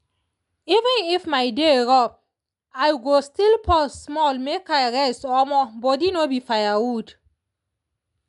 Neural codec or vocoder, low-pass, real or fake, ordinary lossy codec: none; 10.8 kHz; real; none